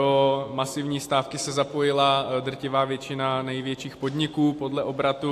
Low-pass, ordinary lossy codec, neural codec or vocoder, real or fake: 14.4 kHz; AAC, 64 kbps; none; real